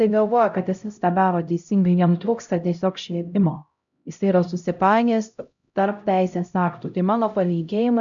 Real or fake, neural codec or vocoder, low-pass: fake; codec, 16 kHz, 0.5 kbps, X-Codec, HuBERT features, trained on LibriSpeech; 7.2 kHz